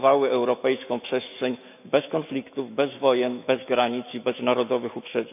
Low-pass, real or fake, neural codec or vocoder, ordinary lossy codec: 3.6 kHz; fake; autoencoder, 48 kHz, 128 numbers a frame, DAC-VAE, trained on Japanese speech; none